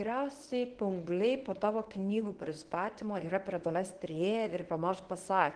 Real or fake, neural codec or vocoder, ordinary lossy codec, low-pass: fake; codec, 24 kHz, 0.9 kbps, WavTokenizer, medium speech release version 1; Opus, 16 kbps; 9.9 kHz